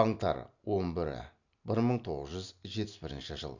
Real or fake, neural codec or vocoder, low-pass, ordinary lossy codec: real; none; 7.2 kHz; none